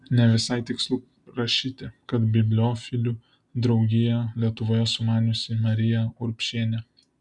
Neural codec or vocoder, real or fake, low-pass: none; real; 10.8 kHz